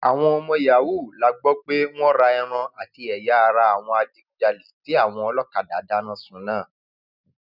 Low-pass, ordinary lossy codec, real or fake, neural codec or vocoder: 5.4 kHz; none; real; none